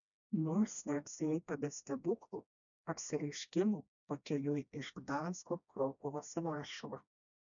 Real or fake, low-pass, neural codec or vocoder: fake; 7.2 kHz; codec, 16 kHz, 1 kbps, FreqCodec, smaller model